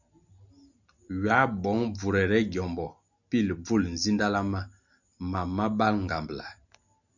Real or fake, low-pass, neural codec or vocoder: real; 7.2 kHz; none